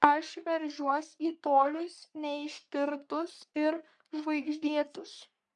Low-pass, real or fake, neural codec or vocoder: 10.8 kHz; fake; codec, 44.1 kHz, 3.4 kbps, Pupu-Codec